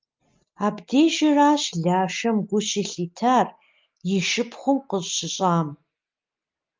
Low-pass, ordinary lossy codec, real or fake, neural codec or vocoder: 7.2 kHz; Opus, 24 kbps; real; none